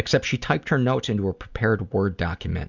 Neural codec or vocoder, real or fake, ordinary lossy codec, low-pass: none; real; Opus, 64 kbps; 7.2 kHz